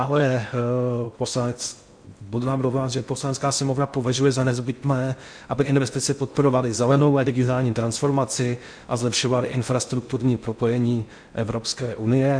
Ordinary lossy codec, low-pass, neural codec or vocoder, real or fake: MP3, 64 kbps; 9.9 kHz; codec, 16 kHz in and 24 kHz out, 0.6 kbps, FocalCodec, streaming, 2048 codes; fake